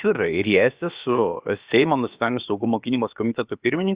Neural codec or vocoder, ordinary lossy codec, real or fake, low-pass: codec, 16 kHz, about 1 kbps, DyCAST, with the encoder's durations; Opus, 64 kbps; fake; 3.6 kHz